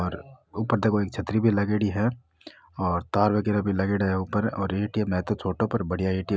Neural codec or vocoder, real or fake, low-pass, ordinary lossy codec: none; real; none; none